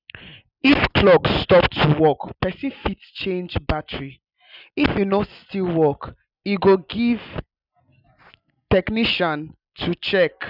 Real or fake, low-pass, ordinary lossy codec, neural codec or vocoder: real; 5.4 kHz; none; none